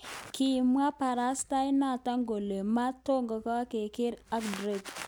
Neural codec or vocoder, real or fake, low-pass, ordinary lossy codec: none; real; none; none